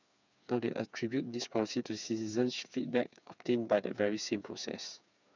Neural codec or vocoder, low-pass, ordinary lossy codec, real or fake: codec, 16 kHz, 4 kbps, FreqCodec, smaller model; 7.2 kHz; none; fake